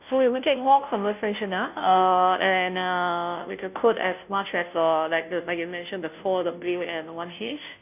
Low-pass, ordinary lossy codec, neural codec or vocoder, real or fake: 3.6 kHz; none; codec, 16 kHz, 0.5 kbps, FunCodec, trained on Chinese and English, 25 frames a second; fake